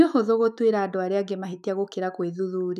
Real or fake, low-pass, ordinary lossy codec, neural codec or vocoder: fake; 14.4 kHz; none; autoencoder, 48 kHz, 128 numbers a frame, DAC-VAE, trained on Japanese speech